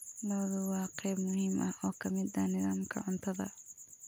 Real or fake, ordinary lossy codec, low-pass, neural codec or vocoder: real; none; none; none